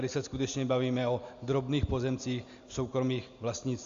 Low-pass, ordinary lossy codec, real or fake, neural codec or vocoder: 7.2 kHz; Opus, 64 kbps; real; none